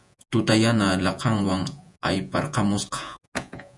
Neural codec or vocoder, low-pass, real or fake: vocoder, 48 kHz, 128 mel bands, Vocos; 10.8 kHz; fake